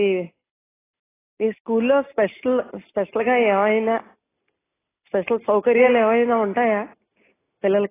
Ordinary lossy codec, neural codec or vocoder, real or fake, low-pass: AAC, 16 kbps; none; real; 3.6 kHz